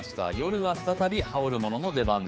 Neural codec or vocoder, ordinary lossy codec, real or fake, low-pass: codec, 16 kHz, 4 kbps, X-Codec, HuBERT features, trained on general audio; none; fake; none